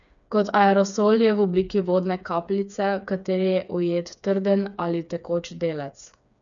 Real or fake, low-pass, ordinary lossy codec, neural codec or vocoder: fake; 7.2 kHz; none; codec, 16 kHz, 4 kbps, FreqCodec, smaller model